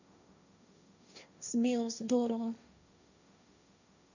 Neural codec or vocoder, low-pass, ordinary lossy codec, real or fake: codec, 16 kHz, 1.1 kbps, Voila-Tokenizer; 7.2 kHz; none; fake